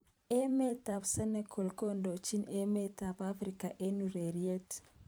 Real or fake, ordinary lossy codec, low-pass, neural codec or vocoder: fake; none; none; vocoder, 44.1 kHz, 128 mel bands every 512 samples, BigVGAN v2